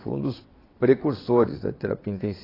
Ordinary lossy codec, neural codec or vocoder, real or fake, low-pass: AAC, 24 kbps; vocoder, 22.05 kHz, 80 mel bands, WaveNeXt; fake; 5.4 kHz